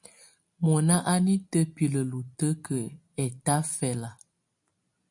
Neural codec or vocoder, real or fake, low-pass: none; real; 10.8 kHz